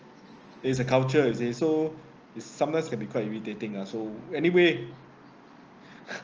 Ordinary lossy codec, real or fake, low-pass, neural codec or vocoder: Opus, 24 kbps; real; 7.2 kHz; none